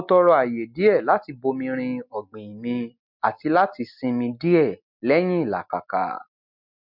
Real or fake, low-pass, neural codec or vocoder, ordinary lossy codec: real; 5.4 kHz; none; MP3, 48 kbps